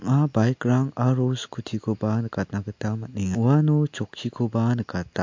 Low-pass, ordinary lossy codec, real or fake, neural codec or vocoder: 7.2 kHz; MP3, 48 kbps; real; none